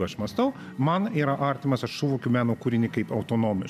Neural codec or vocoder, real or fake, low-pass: none; real; 14.4 kHz